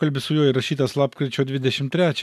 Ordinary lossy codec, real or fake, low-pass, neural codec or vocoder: AAC, 96 kbps; real; 14.4 kHz; none